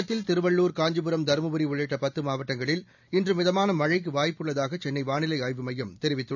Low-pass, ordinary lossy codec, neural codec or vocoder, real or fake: 7.2 kHz; none; none; real